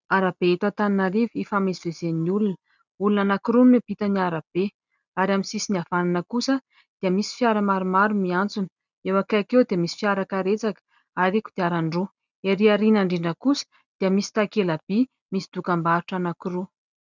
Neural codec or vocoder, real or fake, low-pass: none; real; 7.2 kHz